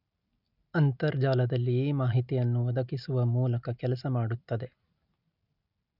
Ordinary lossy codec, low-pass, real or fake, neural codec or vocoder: none; 5.4 kHz; real; none